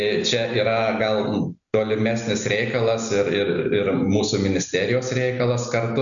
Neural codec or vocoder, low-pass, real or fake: none; 7.2 kHz; real